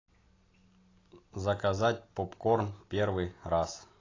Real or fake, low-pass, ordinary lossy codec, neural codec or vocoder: real; 7.2 kHz; AAC, 32 kbps; none